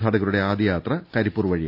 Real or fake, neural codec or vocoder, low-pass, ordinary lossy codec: real; none; 5.4 kHz; none